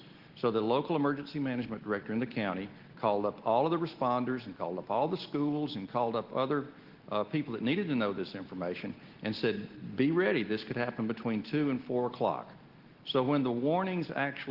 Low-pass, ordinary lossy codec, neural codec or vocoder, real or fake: 5.4 kHz; Opus, 16 kbps; none; real